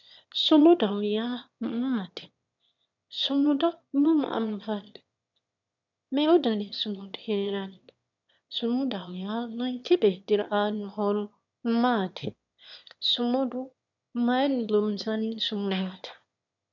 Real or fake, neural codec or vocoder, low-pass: fake; autoencoder, 22.05 kHz, a latent of 192 numbers a frame, VITS, trained on one speaker; 7.2 kHz